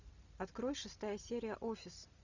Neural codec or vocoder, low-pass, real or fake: none; 7.2 kHz; real